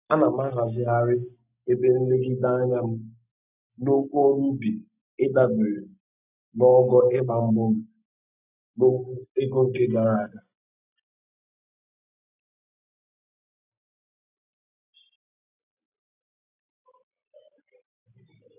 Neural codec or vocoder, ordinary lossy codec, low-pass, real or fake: none; none; 3.6 kHz; real